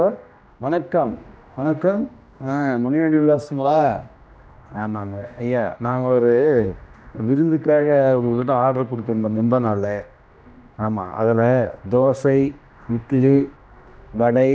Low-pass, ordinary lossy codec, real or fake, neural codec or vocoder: none; none; fake; codec, 16 kHz, 1 kbps, X-Codec, HuBERT features, trained on general audio